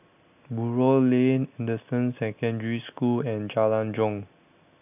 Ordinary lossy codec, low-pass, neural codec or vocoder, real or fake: AAC, 32 kbps; 3.6 kHz; none; real